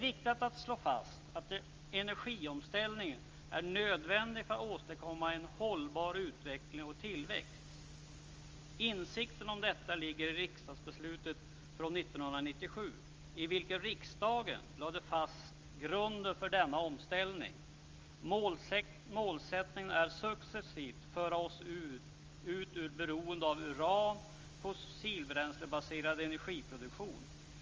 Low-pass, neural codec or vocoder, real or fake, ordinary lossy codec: 7.2 kHz; none; real; Opus, 32 kbps